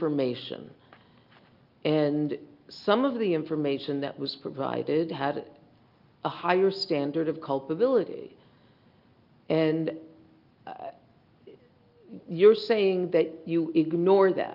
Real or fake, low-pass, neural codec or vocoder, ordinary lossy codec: real; 5.4 kHz; none; Opus, 24 kbps